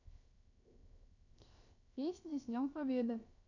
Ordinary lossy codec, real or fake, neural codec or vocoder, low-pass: none; fake; codec, 16 kHz, 0.7 kbps, FocalCodec; 7.2 kHz